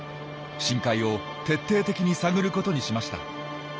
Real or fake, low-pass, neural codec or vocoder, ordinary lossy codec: real; none; none; none